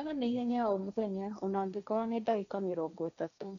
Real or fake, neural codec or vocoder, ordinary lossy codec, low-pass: fake; codec, 16 kHz, 1.1 kbps, Voila-Tokenizer; none; 7.2 kHz